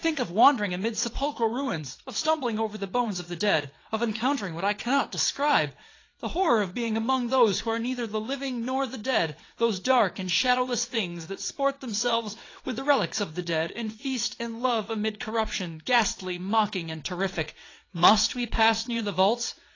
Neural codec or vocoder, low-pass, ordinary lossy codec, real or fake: vocoder, 22.05 kHz, 80 mel bands, WaveNeXt; 7.2 kHz; AAC, 32 kbps; fake